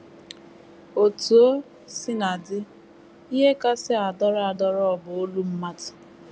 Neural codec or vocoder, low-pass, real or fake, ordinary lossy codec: none; none; real; none